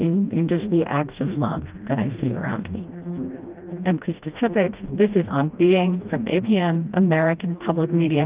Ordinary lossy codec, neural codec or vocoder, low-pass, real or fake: Opus, 32 kbps; codec, 16 kHz, 1 kbps, FreqCodec, smaller model; 3.6 kHz; fake